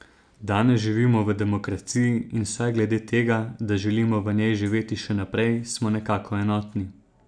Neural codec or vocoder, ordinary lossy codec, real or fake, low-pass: none; none; real; 9.9 kHz